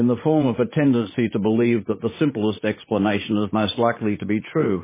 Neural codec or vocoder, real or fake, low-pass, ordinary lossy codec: vocoder, 44.1 kHz, 80 mel bands, Vocos; fake; 3.6 kHz; MP3, 16 kbps